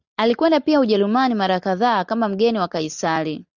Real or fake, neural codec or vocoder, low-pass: real; none; 7.2 kHz